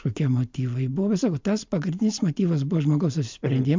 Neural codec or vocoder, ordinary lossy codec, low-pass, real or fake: none; MP3, 64 kbps; 7.2 kHz; real